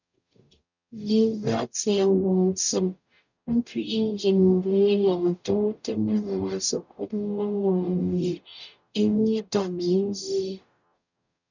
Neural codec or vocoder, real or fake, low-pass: codec, 44.1 kHz, 0.9 kbps, DAC; fake; 7.2 kHz